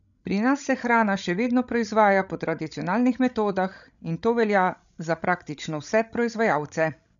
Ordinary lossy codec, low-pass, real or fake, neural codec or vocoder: none; 7.2 kHz; fake; codec, 16 kHz, 16 kbps, FreqCodec, larger model